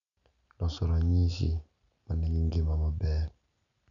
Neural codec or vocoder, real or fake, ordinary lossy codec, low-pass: none; real; none; 7.2 kHz